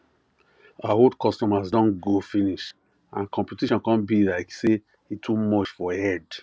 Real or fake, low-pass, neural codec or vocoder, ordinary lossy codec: real; none; none; none